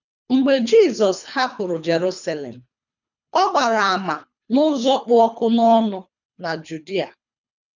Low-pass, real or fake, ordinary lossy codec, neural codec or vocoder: 7.2 kHz; fake; none; codec, 24 kHz, 3 kbps, HILCodec